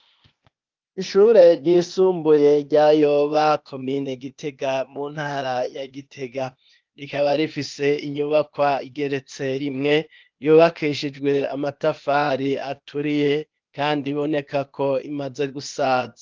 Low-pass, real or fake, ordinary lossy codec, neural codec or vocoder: 7.2 kHz; fake; Opus, 24 kbps; codec, 16 kHz, 0.8 kbps, ZipCodec